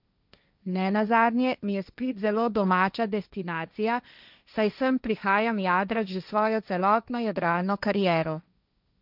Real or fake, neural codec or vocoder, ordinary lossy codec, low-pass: fake; codec, 16 kHz, 1.1 kbps, Voila-Tokenizer; none; 5.4 kHz